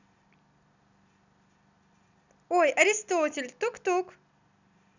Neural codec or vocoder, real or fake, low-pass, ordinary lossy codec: none; real; 7.2 kHz; none